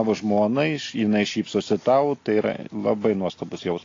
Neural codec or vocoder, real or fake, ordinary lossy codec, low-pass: none; real; AAC, 32 kbps; 7.2 kHz